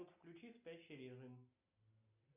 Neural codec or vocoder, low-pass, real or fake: none; 3.6 kHz; real